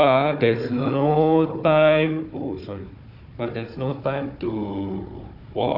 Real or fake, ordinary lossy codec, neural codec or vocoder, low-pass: fake; none; codec, 16 kHz, 4 kbps, FunCodec, trained on Chinese and English, 50 frames a second; 5.4 kHz